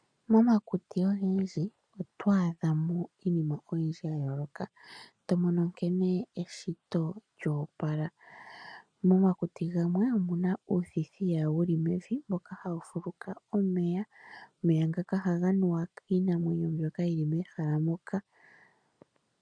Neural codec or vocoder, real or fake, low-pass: none; real; 9.9 kHz